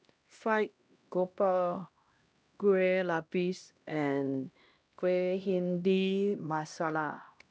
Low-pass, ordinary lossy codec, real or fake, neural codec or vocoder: none; none; fake; codec, 16 kHz, 1 kbps, X-Codec, HuBERT features, trained on LibriSpeech